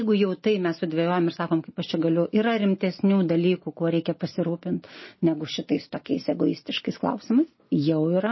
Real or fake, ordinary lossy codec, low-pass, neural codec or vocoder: real; MP3, 24 kbps; 7.2 kHz; none